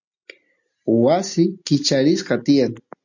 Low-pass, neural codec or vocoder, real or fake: 7.2 kHz; none; real